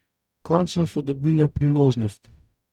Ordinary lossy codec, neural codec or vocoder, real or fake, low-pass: none; codec, 44.1 kHz, 0.9 kbps, DAC; fake; 19.8 kHz